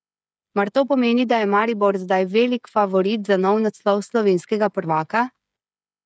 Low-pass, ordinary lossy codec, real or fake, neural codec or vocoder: none; none; fake; codec, 16 kHz, 8 kbps, FreqCodec, smaller model